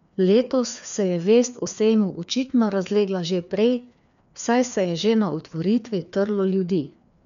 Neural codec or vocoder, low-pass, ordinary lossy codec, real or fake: codec, 16 kHz, 2 kbps, FreqCodec, larger model; 7.2 kHz; none; fake